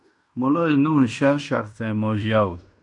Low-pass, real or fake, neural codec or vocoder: 10.8 kHz; fake; codec, 16 kHz in and 24 kHz out, 0.9 kbps, LongCat-Audio-Codec, fine tuned four codebook decoder